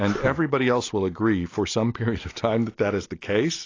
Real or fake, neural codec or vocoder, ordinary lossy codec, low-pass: real; none; AAC, 32 kbps; 7.2 kHz